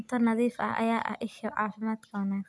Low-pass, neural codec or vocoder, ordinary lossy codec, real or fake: none; none; none; real